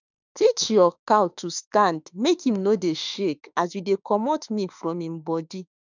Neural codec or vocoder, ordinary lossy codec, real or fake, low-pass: autoencoder, 48 kHz, 32 numbers a frame, DAC-VAE, trained on Japanese speech; none; fake; 7.2 kHz